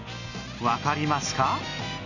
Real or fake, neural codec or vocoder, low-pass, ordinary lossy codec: real; none; 7.2 kHz; none